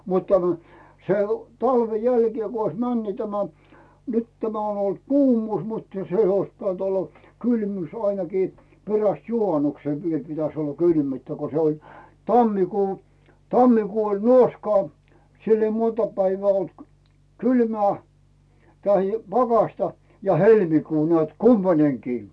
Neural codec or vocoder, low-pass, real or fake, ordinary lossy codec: none; none; real; none